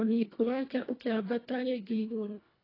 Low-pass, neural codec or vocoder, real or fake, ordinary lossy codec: 5.4 kHz; codec, 24 kHz, 1.5 kbps, HILCodec; fake; AAC, 24 kbps